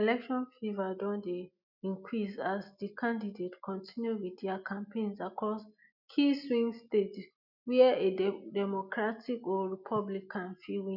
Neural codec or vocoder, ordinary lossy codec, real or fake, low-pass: none; none; real; 5.4 kHz